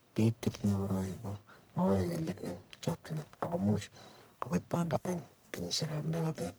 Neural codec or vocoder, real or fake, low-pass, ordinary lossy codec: codec, 44.1 kHz, 1.7 kbps, Pupu-Codec; fake; none; none